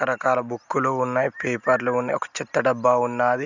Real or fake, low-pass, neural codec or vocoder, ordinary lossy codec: real; 7.2 kHz; none; none